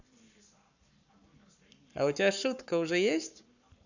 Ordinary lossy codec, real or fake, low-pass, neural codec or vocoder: none; fake; 7.2 kHz; codec, 44.1 kHz, 7.8 kbps, Pupu-Codec